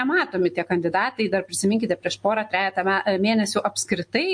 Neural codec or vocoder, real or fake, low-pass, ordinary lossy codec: none; real; 9.9 kHz; MP3, 48 kbps